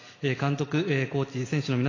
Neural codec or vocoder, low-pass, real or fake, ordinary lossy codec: none; 7.2 kHz; real; AAC, 32 kbps